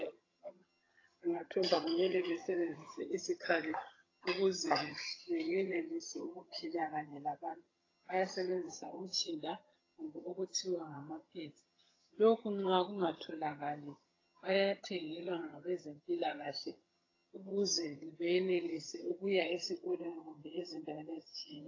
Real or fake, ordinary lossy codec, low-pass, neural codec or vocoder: fake; AAC, 32 kbps; 7.2 kHz; vocoder, 22.05 kHz, 80 mel bands, HiFi-GAN